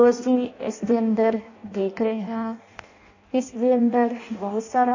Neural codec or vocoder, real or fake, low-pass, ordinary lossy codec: codec, 16 kHz in and 24 kHz out, 0.6 kbps, FireRedTTS-2 codec; fake; 7.2 kHz; AAC, 48 kbps